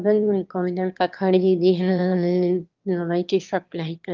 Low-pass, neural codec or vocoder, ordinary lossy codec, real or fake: 7.2 kHz; autoencoder, 22.05 kHz, a latent of 192 numbers a frame, VITS, trained on one speaker; Opus, 32 kbps; fake